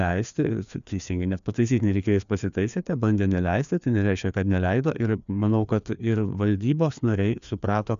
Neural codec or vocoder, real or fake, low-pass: codec, 16 kHz, 2 kbps, FreqCodec, larger model; fake; 7.2 kHz